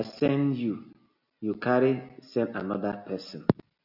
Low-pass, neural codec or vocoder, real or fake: 5.4 kHz; none; real